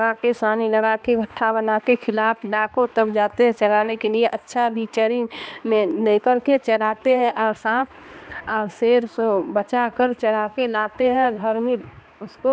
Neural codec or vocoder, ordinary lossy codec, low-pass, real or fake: codec, 16 kHz, 2 kbps, X-Codec, HuBERT features, trained on balanced general audio; none; none; fake